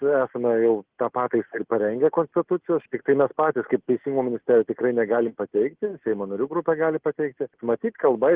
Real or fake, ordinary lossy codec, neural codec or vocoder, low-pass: real; Opus, 16 kbps; none; 3.6 kHz